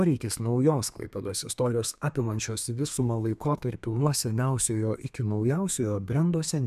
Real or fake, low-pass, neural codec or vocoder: fake; 14.4 kHz; codec, 32 kHz, 1.9 kbps, SNAC